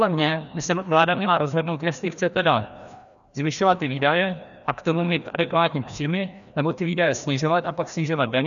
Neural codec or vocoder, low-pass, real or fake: codec, 16 kHz, 1 kbps, FreqCodec, larger model; 7.2 kHz; fake